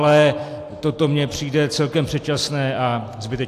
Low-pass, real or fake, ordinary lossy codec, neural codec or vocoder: 14.4 kHz; real; AAC, 64 kbps; none